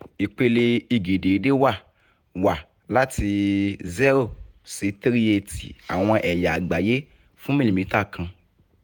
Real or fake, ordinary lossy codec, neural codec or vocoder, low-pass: fake; none; vocoder, 48 kHz, 128 mel bands, Vocos; none